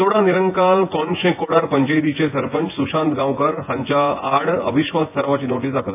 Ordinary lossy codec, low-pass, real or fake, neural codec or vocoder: MP3, 32 kbps; 3.6 kHz; fake; vocoder, 24 kHz, 100 mel bands, Vocos